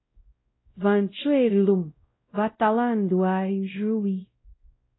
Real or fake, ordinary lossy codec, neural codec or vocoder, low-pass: fake; AAC, 16 kbps; codec, 16 kHz, 0.5 kbps, X-Codec, WavLM features, trained on Multilingual LibriSpeech; 7.2 kHz